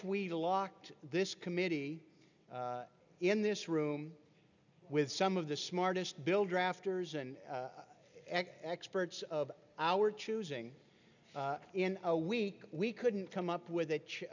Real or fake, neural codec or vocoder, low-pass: real; none; 7.2 kHz